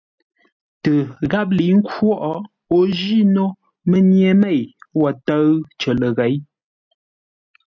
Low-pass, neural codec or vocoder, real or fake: 7.2 kHz; none; real